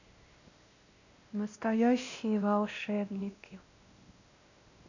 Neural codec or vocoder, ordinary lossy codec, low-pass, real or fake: codec, 16 kHz, 1 kbps, X-Codec, WavLM features, trained on Multilingual LibriSpeech; none; 7.2 kHz; fake